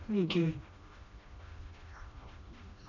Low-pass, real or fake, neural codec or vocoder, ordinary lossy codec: 7.2 kHz; fake; codec, 16 kHz, 1 kbps, FreqCodec, smaller model; AAC, 48 kbps